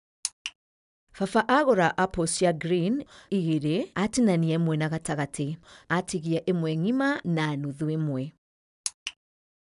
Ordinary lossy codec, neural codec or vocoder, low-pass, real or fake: none; none; 10.8 kHz; real